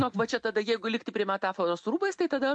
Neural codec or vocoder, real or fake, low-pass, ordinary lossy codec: none; real; 9.9 kHz; MP3, 64 kbps